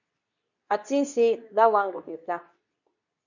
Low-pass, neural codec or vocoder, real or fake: 7.2 kHz; codec, 24 kHz, 0.9 kbps, WavTokenizer, medium speech release version 2; fake